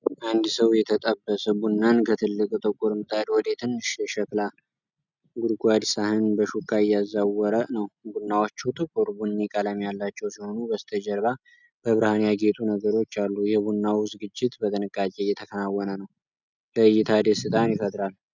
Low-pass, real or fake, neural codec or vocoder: 7.2 kHz; real; none